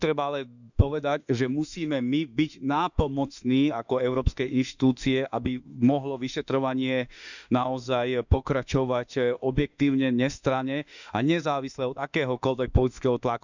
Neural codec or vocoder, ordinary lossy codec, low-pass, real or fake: autoencoder, 48 kHz, 32 numbers a frame, DAC-VAE, trained on Japanese speech; none; 7.2 kHz; fake